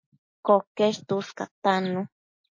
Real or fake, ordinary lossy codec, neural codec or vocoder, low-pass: real; MP3, 32 kbps; none; 7.2 kHz